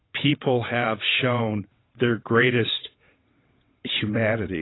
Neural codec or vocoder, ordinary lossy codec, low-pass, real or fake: vocoder, 22.05 kHz, 80 mel bands, WaveNeXt; AAC, 16 kbps; 7.2 kHz; fake